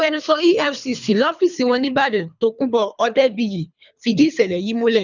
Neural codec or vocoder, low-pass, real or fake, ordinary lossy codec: codec, 24 kHz, 3 kbps, HILCodec; 7.2 kHz; fake; none